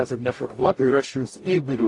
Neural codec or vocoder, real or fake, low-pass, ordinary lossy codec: codec, 44.1 kHz, 0.9 kbps, DAC; fake; 10.8 kHz; AAC, 48 kbps